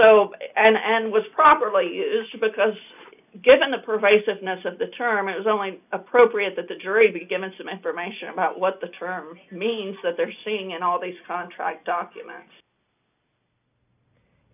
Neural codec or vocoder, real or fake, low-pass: none; real; 3.6 kHz